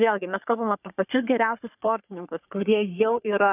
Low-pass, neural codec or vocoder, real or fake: 3.6 kHz; codec, 44.1 kHz, 3.4 kbps, Pupu-Codec; fake